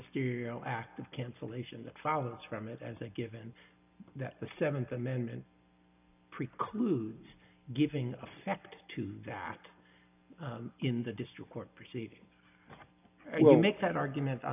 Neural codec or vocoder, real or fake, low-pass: none; real; 3.6 kHz